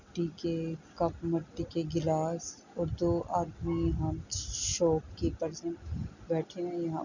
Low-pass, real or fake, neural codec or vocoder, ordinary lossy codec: 7.2 kHz; real; none; none